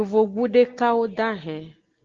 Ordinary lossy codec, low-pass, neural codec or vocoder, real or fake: Opus, 16 kbps; 7.2 kHz; none; real